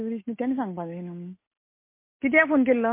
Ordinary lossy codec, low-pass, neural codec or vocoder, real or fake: MP3, 24 kbps; 3.6 kHz; none; real